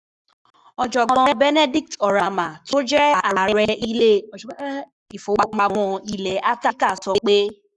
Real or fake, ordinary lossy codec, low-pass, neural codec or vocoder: fake; none; 10.8 kHz; codec, 44.1 kHz, 7.8 kbps, Pupu-Codec